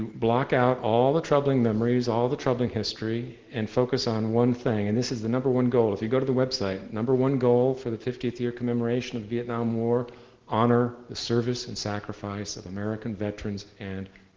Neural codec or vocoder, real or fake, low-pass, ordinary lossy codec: none; real; 7.2 kHz; Opus, 16 kbps